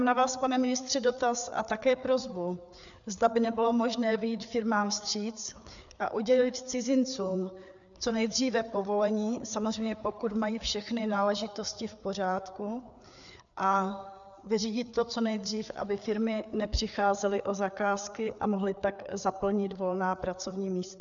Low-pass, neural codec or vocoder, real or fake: 7.2 kHz; codec, 16 kHz, 4 kbps, FreqCodec, larger model; fake